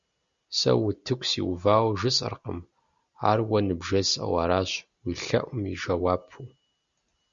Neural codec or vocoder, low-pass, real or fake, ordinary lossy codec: none; 7.2 kHz; real; Opus, 64 kbps